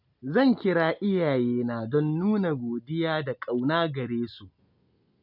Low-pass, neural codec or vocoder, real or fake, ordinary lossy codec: 5.4 kHz; none; real; none